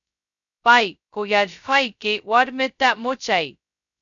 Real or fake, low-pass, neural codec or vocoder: fake; 7.2 kHz; codec, 16 kHz, 0.2 kbps, FocalCodec